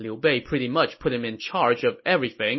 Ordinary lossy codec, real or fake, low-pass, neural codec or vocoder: MP3, 24 kbps; real; 7.2 kHz; none